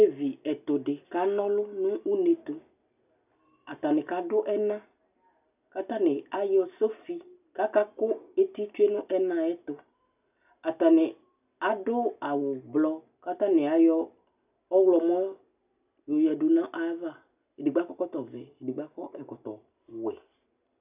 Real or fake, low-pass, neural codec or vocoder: real; 3.6 kHz; none